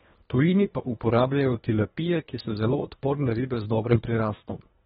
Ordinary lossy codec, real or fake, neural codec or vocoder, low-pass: AAC, 16 kbps; fake; codec, 24 kHz, 1.5 kbps, HILCodec; 10.8 kHz